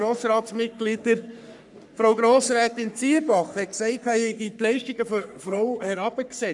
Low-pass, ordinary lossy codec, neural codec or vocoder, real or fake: 10.8 kHz; none; codec, 44.1 kHz, 3.4 kbps, Pupu-Codec; fake